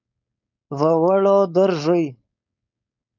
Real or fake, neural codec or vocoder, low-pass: fake; codec, 16 kHz, 4.8 kbps, FACodec; 7.2 kHz